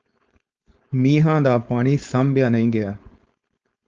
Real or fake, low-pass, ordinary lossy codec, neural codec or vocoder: fake; 7.2 kHz; Opus, 24 kbps; codec, 16 kHz, 4.8 kbps, FACodec